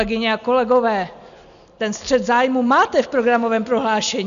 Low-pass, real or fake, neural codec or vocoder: 7.2 kHz; real; none